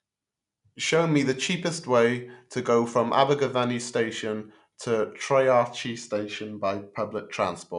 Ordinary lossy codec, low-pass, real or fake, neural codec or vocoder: none; 10.8 kHz; real; none